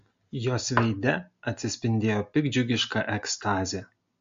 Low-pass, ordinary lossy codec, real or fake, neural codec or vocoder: 7.2 kHz; MP3, 48 kbps; real; none